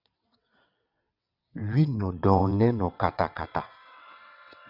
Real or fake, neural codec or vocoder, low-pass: fake; vocoder, 22.05 kHz, 80 mel bands, WaveNeXt; 5.4 kHz